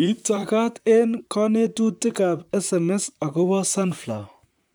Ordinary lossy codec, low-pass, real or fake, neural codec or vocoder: none; none; fake; vocoder, 44.1 kHz, 128 mel bands, Pupu-Vocoder